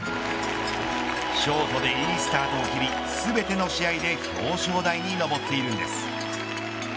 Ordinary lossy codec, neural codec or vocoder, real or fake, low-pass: none; none; real; none